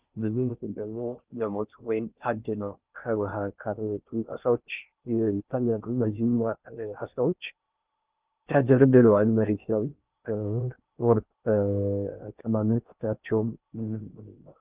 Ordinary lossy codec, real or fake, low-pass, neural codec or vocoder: Opus, 32 kbps; fake; 3.6 kHz; codec, 16 kHz in and 24 kHz out, 0.6 kbps, FocalCodec, streaming, 4096 codes